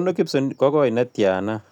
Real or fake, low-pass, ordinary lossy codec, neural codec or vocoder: real; 19.8 kHz; none; none